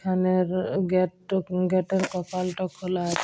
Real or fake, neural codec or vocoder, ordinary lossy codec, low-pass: real; none; none; none